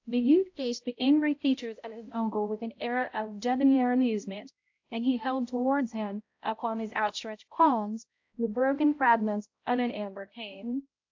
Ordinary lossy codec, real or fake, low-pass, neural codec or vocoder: AAC, 48 kbps; fake; 7.2 kHz; codec, 16 kHz, 0.5 kbps, X-Codec, HuBERT features, trained on balanced general audio